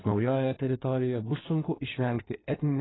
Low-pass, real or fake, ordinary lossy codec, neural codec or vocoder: 7.2 kHz; fake; AAC, 16 kbps; codec, 16 kHz in and 24 kHz out, 1.1 kbps, FireRedTTS-2 codec